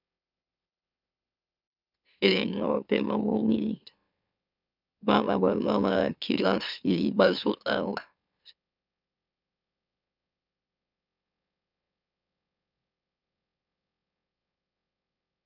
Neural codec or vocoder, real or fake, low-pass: autoencoder, 44.1 kHz, a latent of 192 numbers a frame, MeloTTS; fake; 5.4 kHz